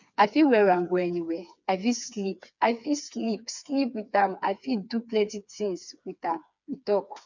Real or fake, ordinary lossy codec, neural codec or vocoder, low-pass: fake; none; codec, 16 kHz, 4 kbps, FreqCodec, smaller model; 7.2 kHz